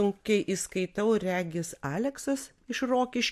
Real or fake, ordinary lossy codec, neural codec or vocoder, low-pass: real; MP3, 64 kbps; none; 14.4 kHz